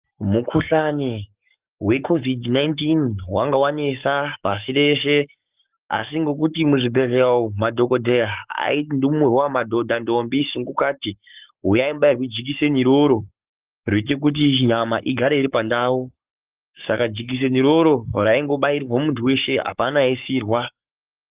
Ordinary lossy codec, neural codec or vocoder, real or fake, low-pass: Opus, 32 kbps; codec, 44.1 kHz, 7.8 kbps, Pupu-Codec; fake; 3.6 kHz